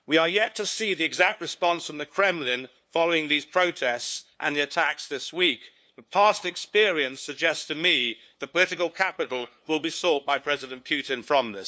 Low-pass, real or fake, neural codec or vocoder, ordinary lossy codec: none; fake; codec, 16 kHz, 2 kbps, FunCodec, trained on LibriTTS, 25 frames a second; none